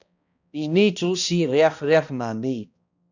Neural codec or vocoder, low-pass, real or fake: codec, 16 kHz, 1 kbps, X-Codec, HuBERT features, trained on balanced general audio; 7.2 kHz; fake